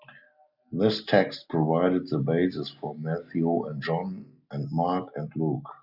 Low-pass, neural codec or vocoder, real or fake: 5.4 kHz; none; real